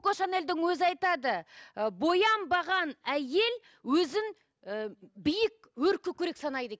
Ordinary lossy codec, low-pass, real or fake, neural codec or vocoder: none; none; real; none